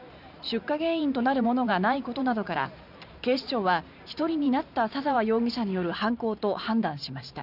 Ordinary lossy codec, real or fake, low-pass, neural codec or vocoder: none; fake; 5.4 kHz; vocoder, 44.1 kHz, 128 mel bands every 512 samples, BigVGAN v2